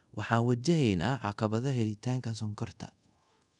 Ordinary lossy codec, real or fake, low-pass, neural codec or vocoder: none; fake; 10.8 kHz; codec, 24 kHz, 0.5 kbps, DualCodec